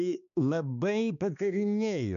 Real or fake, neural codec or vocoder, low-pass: fake; codec, 16 kHz, 2 kbps, X-Codec, HuBERT features, trained on balanced general audio; 7.2 kHz